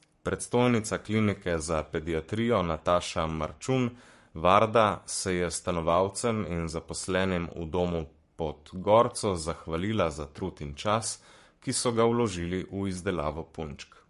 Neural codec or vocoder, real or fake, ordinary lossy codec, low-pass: codec, 44.1 kHz, 7.8 kbps, Pupu-Codec; fake; MP3, 48 kbps; 14.4 kHz